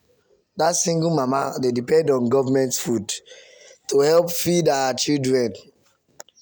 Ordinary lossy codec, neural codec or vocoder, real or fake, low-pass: none; none; real; none